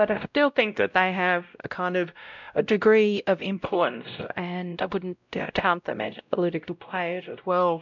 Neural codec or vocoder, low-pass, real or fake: codec, 16 kHz, 0.5 kbps, X-Codec, WavLM features, trained on Multilingual LibriSpeech; 7.2 kHz; fake